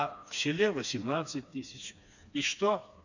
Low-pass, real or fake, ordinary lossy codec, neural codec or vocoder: 7.2 kHz; fake; none; codec, 16 kHz, 2 kbps, FreqCodec, smaller model